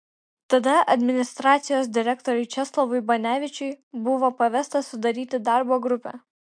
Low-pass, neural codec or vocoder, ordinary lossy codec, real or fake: 9.9 kHz; none; MP3, 64 kbps; real